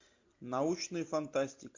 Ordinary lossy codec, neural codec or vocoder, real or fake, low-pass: MP3, 48 kbps; none; real; 7.2 kHz